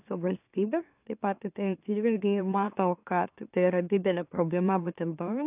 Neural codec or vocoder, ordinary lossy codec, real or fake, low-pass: autoencoder, 44.1 kHz, a latent of 192 numbers a frame, MeloTTS; AAC, 32 kbps; fake; 3.6 kHz